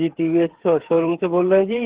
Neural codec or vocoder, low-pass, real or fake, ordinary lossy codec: codec, 16 kHz, 8 kbps, FreqCodec, smaller model; 3.6 kHz; fake; Opus, 16 kbps